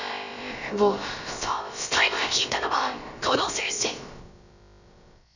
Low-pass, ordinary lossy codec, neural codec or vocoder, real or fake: 7.2 kHz; none; codec, 16 kHz, about 1 kbps, DyCAST, with the encoder's durations; fake